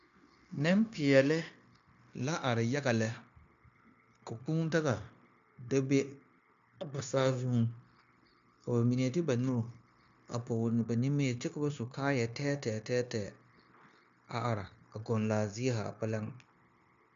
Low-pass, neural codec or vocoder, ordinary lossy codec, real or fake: 7.2 kHz; codec, 16 kHz, 0.9 kbps, LongCat-Audio-Codec; MP3, 64 kbps; fake